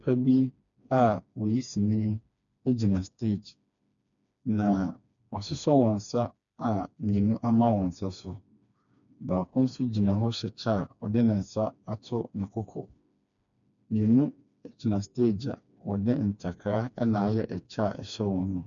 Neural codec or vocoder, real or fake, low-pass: codec, 16 kHz, 2 kbps, FreqCodec, smaller model; fake; 7.2 kHz